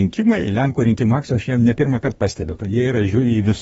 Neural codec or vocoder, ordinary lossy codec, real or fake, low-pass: codec, 32 kHz, 1.9 kbps, SNAC; AAC, 24 kbps; fake; 14.4 kHz